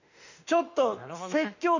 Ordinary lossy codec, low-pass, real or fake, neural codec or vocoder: none; 7.2 kHz; fake; autoencoder, 48 kHz, 32 numbers a frame, DAC-VAE, trained on Japanese speech